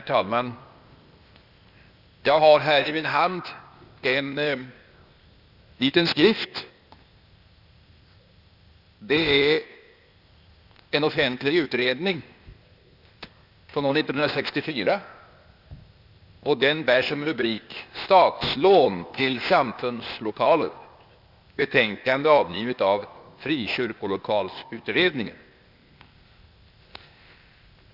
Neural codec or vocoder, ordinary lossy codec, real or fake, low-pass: codec, 16 kHz, 0.8 kbps, ZipCodec; Opus, 64 kbps; fake; 5.4 kHz